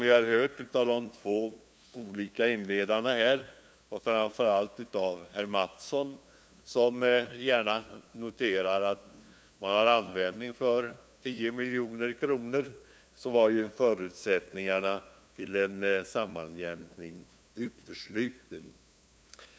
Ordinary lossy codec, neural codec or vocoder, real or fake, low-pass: none; codec, 16 kHz, 2 kbps, FunCodec, trained on LibriTTS, 25 frames a second; fake; none